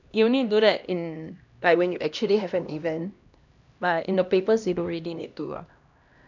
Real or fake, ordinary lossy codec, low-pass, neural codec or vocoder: fake; none; 7.2 kHz; codec, 16 kHz, 1 kbps, X-Codec, HuBERT features, trained on LibriSpeech